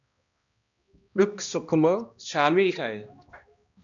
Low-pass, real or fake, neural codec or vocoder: 7.2 kHz; fake; codec, 16 kHz, 1 kbps, X-Codec, HuBERT features, trained on balanced general audio